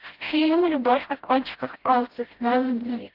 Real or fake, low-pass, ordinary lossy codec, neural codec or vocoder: fake; 5.4 kHz; Opus, 16 kbps; codec, 16 kHz, 0.5 kbps, FreqCodec, smaller model